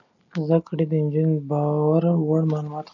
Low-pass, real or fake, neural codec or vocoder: 7.2 kHz; real; none